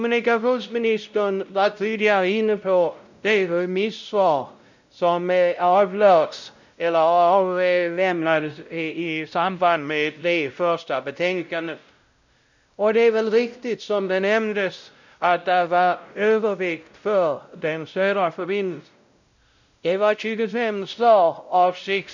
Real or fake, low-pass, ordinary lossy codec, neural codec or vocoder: fake; 7.2 kHz; none; codec, 16 kHz, 0.5 kbps, X-Codec, WavLM features, trained on Multilingual LibriSpeech